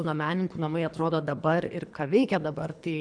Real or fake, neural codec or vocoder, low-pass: fake; codec, 24 kHz, 3 kbps, HILCodec; 9.9 kHz